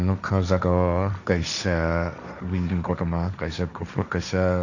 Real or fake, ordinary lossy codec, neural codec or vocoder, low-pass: fake; none; codec, 16 kHz, 1.1 kbps, Voila-Tokenizer; 7.2 kHz